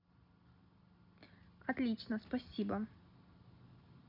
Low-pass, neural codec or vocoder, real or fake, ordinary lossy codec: 5.4 kHz; none; real; none